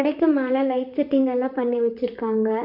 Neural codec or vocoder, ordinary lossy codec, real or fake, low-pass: vocoder, 44.1 kHz, 128 mel bands, Pupu-Vocoder; none; fake; 5.4 kHz